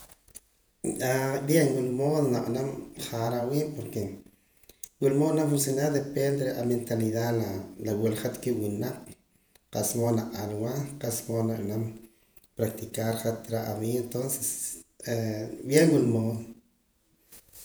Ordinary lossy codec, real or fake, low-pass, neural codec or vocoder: none; fake; none; vocoder, 48 kHz, 128 mel bands, Vocos